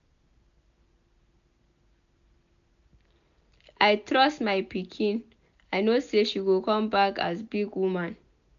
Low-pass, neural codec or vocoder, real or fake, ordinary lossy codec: 7.2 kHz; none; real; none